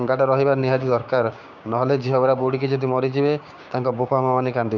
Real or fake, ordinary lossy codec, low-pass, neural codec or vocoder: fake; none; 7.2 kHz; codec, 16 kHz, 6 kbps, DAC